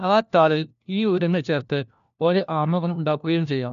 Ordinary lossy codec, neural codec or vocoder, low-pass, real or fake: none; codec, 16 kHz, 1 kbps, FunCodec, trained on LibriTTS, 50 frames a second; 7.2 kHz; fake